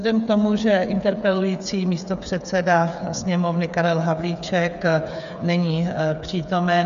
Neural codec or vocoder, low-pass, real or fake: codec, 16 kHz, 8 kbps, FreqCodec, smaller model; 7.2 kHz; fake